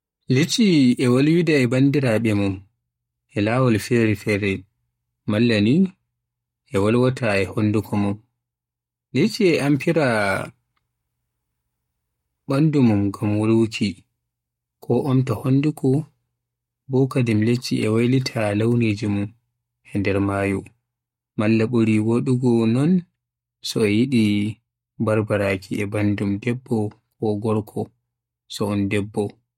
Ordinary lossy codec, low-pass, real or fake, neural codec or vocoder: MP3, 64 kbps; 19.8 kHz; fake; codec, 44.1 kHz, 7.8 kbps, Pupu-Codec